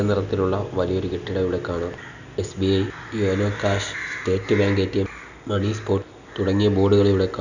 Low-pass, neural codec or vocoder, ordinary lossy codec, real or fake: 7.2 kHz; none; none; real